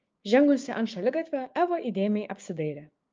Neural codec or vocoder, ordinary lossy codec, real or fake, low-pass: codec, 16 kHz, 6 kbps, DAC; Opus, 24 kbps; fake; 7.2 kHz